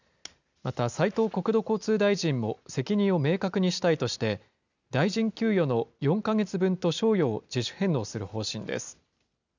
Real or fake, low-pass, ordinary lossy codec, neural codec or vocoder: real; 7.2 kHz; none; none